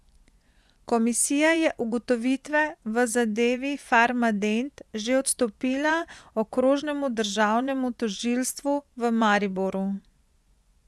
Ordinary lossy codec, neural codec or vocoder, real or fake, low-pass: none; vocoder, 24 kHz, 100 mel bands, Vocos; fake; none